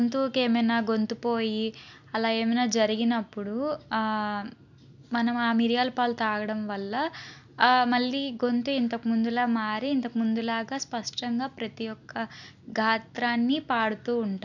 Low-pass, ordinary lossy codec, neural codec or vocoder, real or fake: 7.2 kHz; none; none; real